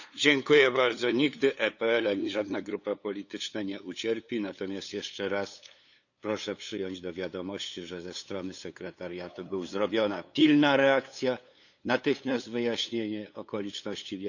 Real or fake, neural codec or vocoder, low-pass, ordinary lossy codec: fake; codec, 16 kHz, 8 kbps, FunCodec, trained on LibriTTS, 25 frames a second; 7.2 kHz; none